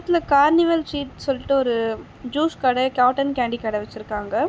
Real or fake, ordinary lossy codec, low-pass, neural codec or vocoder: real; none; none; none